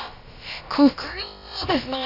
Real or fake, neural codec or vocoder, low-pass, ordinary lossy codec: fake; codec, 16 kHz, about 1 kbps, DyCAST, with the encoder's durations; 5.4 kHz; none